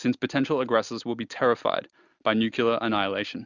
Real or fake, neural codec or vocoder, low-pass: real; none; 7.2 kHz